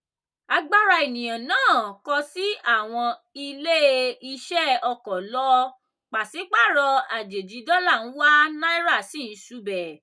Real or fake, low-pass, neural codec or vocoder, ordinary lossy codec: real; none; none; none